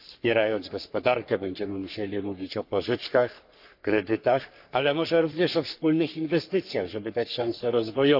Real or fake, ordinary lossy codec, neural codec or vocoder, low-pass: fake; none; codec, 44.1 kHz, 3.4 kbps, Pupu-Codec; 5.4 kHz